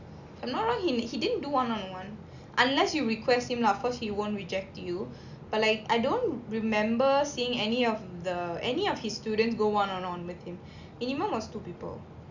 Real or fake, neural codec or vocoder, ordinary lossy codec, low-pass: real; none; none; 7.2 kHz